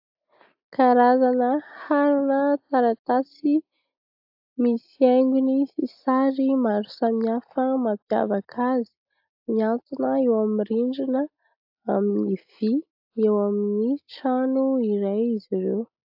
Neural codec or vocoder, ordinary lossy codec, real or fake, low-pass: none; AAC, 48 kbps; real; 5.4 kHz